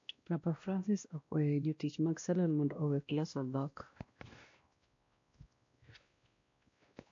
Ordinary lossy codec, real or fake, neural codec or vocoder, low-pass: MP3, 48 kbps; fake; codec, 16 kHz, 1 kbps, X-Codec, WavLM features, trained on Multilingual LibriSpeech; 7.2 kHz